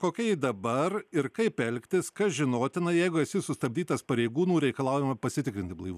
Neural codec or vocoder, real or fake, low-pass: none; real; 14.4 kHz